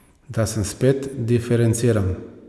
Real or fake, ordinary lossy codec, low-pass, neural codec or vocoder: real; none; none; none